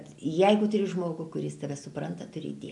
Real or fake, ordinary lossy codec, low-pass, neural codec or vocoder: real; AAC, 64 kbps; 10.8 kHz; none